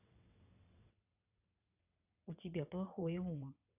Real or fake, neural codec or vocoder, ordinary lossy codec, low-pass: fake; vocoder, 22.05 kHz, 80 mel bands, WaveNeXt; none; 3.6 kHz